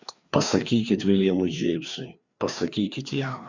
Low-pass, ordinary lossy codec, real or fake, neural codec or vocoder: 7.2 kHz; Opus, 64 kbps; fake; codec, 16 kHz, 2 kbps, FreqCodec, larger model